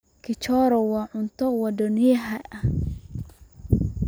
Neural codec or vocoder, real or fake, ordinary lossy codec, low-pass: none; real; none; none